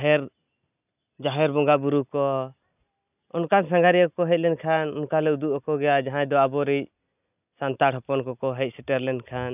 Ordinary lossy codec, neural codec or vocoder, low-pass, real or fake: none; none; 3.6 kHz; real